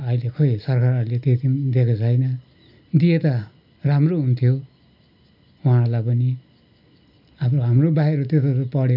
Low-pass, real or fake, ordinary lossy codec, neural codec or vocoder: 5.4 kHz; real; none; none